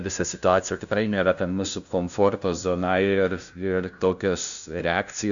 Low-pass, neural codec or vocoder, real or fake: 7.2 kHz; codec, 16 kHz, 0.5 kbps, FunCodec, trained on LibriTTS, 25 frames a second; fake